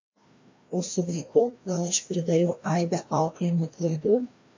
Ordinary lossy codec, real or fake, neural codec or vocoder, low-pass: MP3, 48 kbps; fake; codec, 16 kHz, 1 kbps, FreqCodec, larger model; 7.2 kHz